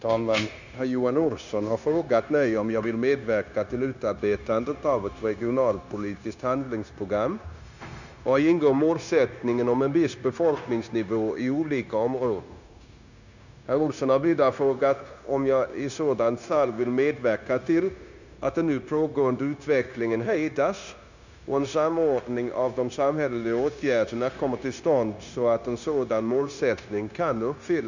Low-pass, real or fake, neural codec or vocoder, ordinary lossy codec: 7.2 kHz; fake; codec, 16 kHz, 0.9 kbps, LongCat-Audio-Codec; none